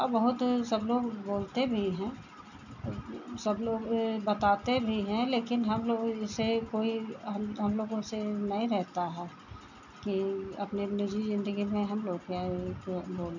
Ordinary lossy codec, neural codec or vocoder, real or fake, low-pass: none; none; real; 7.2 kHz